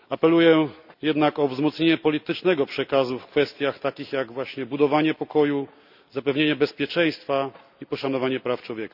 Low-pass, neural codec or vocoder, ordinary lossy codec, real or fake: 5.4 kHz; none; none; real